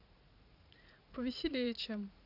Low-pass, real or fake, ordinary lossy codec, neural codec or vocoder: 5.4 kHz; fake; AAC, 48 kbps; vocoder, 44.1 kHz, 80 mel bands, Vocos